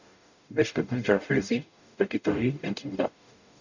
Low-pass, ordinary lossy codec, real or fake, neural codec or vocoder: 7.2 kHz; none; fake; codec, 44.1 kHz, 0.9 kbps, DAC